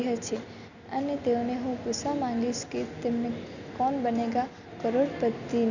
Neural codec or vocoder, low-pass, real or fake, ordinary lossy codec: none; 7.2 kHz; real; none